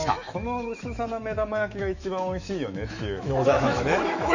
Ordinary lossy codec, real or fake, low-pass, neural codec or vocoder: none; fake; 7.2 kHz; autoencoder, 48 kHz, 128 numbers a frame, DAC-VAE, trained on Japanese speech